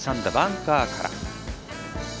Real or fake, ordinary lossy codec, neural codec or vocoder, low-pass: real; none; none; none